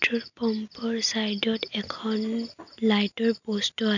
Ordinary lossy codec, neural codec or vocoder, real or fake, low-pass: none; none; real; 7.2 kHz